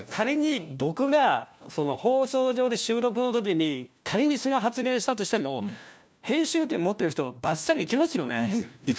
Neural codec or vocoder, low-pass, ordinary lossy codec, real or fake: codec, 16 kHz, 1 kbps, FunCodec, trained on LibriTTS, 50 frames a second; none; none; fake